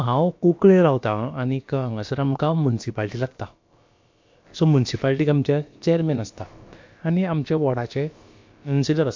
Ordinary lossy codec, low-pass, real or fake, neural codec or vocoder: MP3, 48 kbps; 7.2 kHz; fake; codec, 16 kHz, about 1 kbps, DyCAST, with the encoder's durations